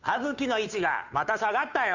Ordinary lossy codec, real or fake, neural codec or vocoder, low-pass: none; fake; codec, 16 kHz, 2 kbps, FunCodec, trained on Chinese and English, 25 frames a second; 7.2 kHz